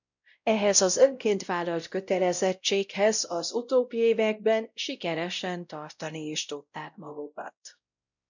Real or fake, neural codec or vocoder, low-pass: fake; codec, 16 kHz, 0.5 kbps, X-Codec, WavLM features, trained on Multilingual LibriSpeech; 7.2 kHz